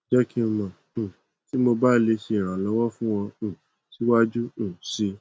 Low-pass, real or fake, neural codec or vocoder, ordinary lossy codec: none; real; none; none